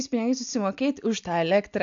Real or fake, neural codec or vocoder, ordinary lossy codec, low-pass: real; none; AAC, 64 kbps; 7.2 kHz